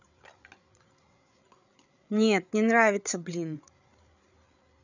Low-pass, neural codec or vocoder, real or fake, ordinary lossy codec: 7.2 kHz; codec, 16 kHz, 8 kbps, FreqCodec, larger model; fake; none